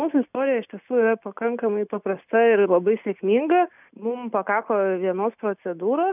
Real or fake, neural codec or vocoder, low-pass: real; none; 3.6 kHz